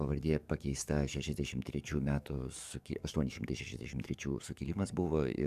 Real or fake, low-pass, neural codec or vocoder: fake; 14.4 kHz; codec, 44.1 kHz, 7.8 kbps, DAC